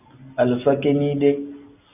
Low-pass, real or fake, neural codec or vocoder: 3.6 kHz; real; none